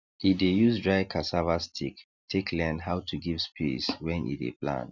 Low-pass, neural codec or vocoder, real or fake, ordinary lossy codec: 7.2 kHz; none; real; none